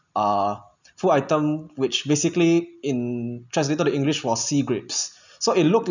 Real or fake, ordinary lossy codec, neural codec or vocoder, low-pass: real; none; none; 7.2 kHz